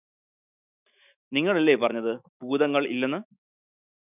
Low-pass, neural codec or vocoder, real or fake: 3.6 kHz; autoencoder, 48 kHz, 128 numbers a frame, DAC-VAE, trained on Japanese speech; fake